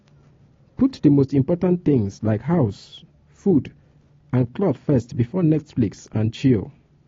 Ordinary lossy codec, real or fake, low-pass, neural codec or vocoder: AAC, 32 kbps; real; 7.2 kHz; none